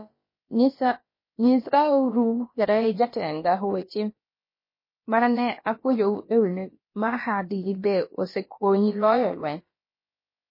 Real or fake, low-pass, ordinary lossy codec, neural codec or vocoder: fake; 5.4 kHz; MP3, 24 kbps; codec, 16 kHz, about 1 kbps, DyCAST, with the encoder's durations